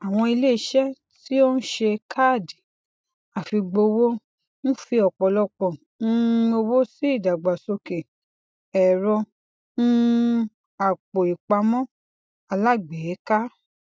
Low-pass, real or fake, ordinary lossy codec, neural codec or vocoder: none; real; none; none